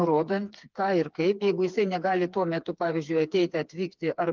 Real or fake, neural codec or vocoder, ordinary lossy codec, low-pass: fake; codec, 16 kHz, 4 kbps, FreqCodec, smaller model; Opus, 24 kbps; 7.2 kHz